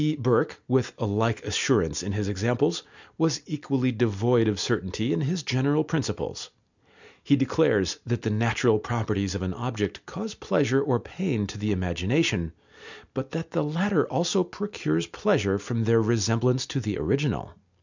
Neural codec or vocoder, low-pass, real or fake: none; 7.2 kHz; real